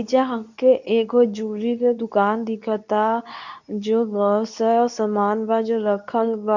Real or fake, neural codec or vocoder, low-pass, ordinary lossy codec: fake; codec, 24 kHz, 0.9 kbps, WavTokenizer, medium speech release version 2; 7.2 kHz; none